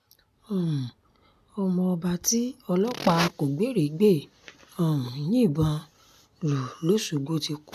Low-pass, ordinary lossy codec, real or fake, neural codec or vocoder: 14.4 kHz; none; real; none